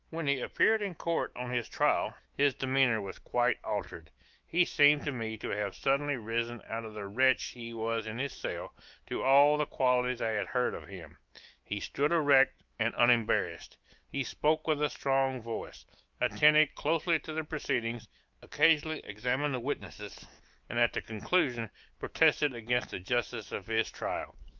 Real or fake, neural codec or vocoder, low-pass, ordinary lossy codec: fake; autoencoder, 48 kHz, 128 numbers a frame, DAC-VAE, trained on Japanese speech; 7.2 kHz; Opus, 32 kbps